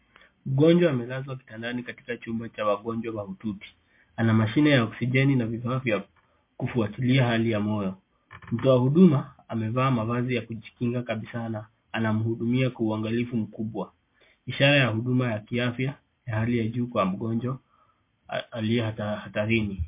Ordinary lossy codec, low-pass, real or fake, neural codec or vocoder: MP3, 24 kbps; 3.6 kHz; real; none